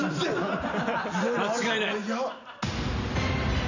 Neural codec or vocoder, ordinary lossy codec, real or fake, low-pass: none; none; real; 7.2 kHz